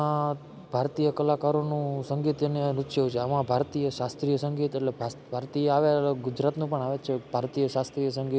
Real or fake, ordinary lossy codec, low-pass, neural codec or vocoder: real; none; none; none